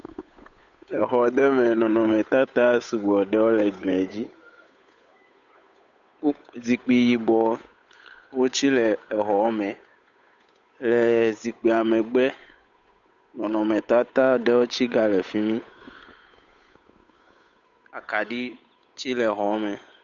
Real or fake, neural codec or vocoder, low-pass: fake; codec, 16 kHz, 8 kbps, FunCodec, trained on Chinese and English, 25 frames a second; 7.2 kHz